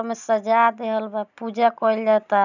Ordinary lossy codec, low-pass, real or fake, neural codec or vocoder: none; 7.2 kHz; real; none